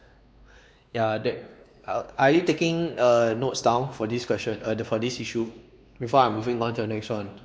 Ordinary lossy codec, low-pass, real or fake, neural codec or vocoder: none; none; fake; codec, 16 kHz, 2 kbps, X-Codec, WavLM features, trained on Multilingual LibriSpeech